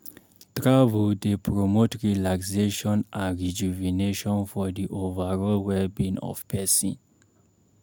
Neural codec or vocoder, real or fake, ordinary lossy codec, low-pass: none; real; none; 19.8 kHz